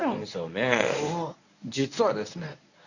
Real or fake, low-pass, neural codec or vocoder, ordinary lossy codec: fake; 7.2 kHz; codec, 24 kHz, 0.9 kbps, WavTokenizer, medium speech release version 1; none